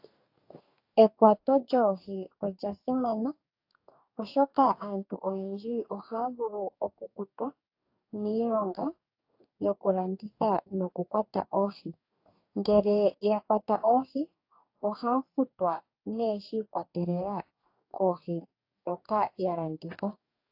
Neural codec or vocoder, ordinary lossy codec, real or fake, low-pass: codec, 44.1 kHz, 2.6 kbps, DAC; AAC, 32 kbps; fake; 5.4 kHz